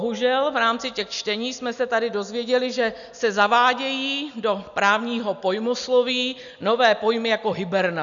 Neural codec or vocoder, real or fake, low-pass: none; real; 7.2 kHz